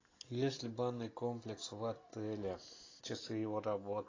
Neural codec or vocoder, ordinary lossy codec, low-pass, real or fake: none; AAC, 32 kbps; 7.2 kHz; real